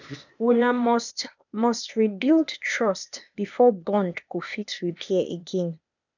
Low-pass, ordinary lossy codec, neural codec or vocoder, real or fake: 7.2 kHz; none; codec, 16 kHz, 0.8 kbps, ZipCodec; fake